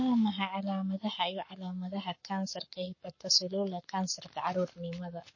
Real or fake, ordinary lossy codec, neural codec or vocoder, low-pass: fake; MP3, 32 kbps; codec, 44.1 kHz, 7.8 kbps, Pupu-Codec; 7.2 kHz